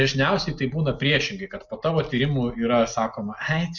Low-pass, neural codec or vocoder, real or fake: 7.2 kHz; none; real